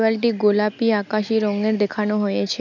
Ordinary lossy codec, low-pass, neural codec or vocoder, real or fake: none; 7.2 kHz; none; real